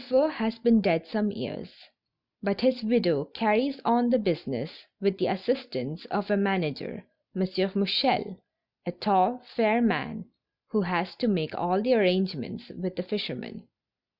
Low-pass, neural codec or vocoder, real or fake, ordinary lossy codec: 5.4 kHz; none; real; Opus, 64 kbps